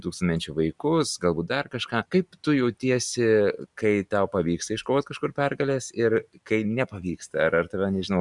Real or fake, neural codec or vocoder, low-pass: real; none; 10.8 kHz